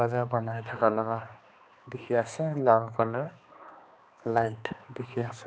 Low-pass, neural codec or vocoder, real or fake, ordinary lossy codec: none; codec, 16 kHz, 2 kbps, X-Codec, HuBERT features, trained on balanced general audio; fake; none